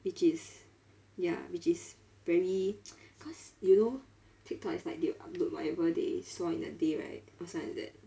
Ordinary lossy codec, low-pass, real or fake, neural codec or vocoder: none; none; real; none